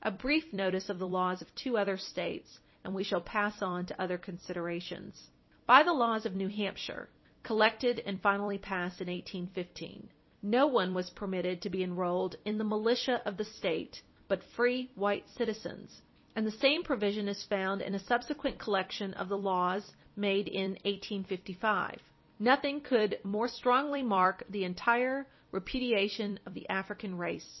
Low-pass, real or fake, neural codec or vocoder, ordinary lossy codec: 7.2 kHz; fake; vocoder, 44.1 kHz, 128 mel bands every 256 samples, BigVGAN v2; MP3, 24 kbps